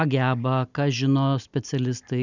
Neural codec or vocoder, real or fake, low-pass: none; real; 7.2 kHz